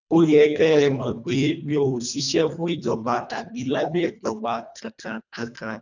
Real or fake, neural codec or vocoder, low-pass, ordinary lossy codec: fake; codec, 24 kHz, 1.5 kbps, HILCodec; 7.2 kHz; none